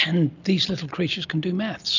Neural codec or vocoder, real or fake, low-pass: none; real; 7.2 kHz